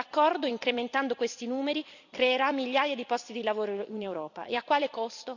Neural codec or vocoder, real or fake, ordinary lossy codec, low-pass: vocoder, 44.1 kHz, 128 mel bands every 256 samples, BigVGAN v2; fake; none; 7.2 kHz